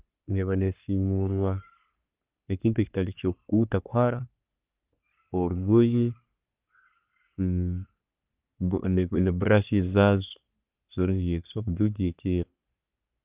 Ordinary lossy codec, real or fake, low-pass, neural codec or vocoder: Opus, 32 kbps; fake; 3.6 kHz; codec, 44.1 kHz, 3.4 kbps, Pupu-Codec